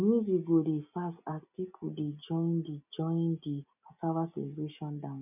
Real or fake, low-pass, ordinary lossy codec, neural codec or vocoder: real; 3.6 kHz; none; none